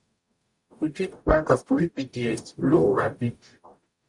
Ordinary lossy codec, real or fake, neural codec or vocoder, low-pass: Opus, 64 kbps; fake; codec, 44.1 kHz, 0.9 kbps, DAC; 10.8 kHz